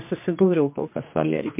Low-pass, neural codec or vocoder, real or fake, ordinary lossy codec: 3.6 kHz; codec, 16 kHz, 2 kbps, X-Codec, HuBERT features, trained on general audio; fake; MP3, 32 kbps